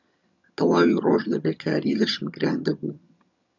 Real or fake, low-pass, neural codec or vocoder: fake; 7.2 kHz; vocoder, 22.05 kHz, 80 mel bands, HiFi-GAN